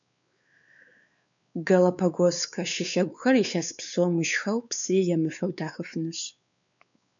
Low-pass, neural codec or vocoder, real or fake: 7.2 kHz; codec, 16 kHz, 4 kbps, X-Codec, WavLM features, trained on Multilingual LibriSpeech; fake